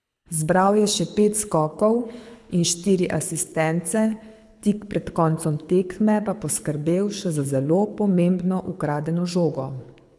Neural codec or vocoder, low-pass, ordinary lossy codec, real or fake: codec, 24 kHz, 6 kbps, HILCodec; none; none; fake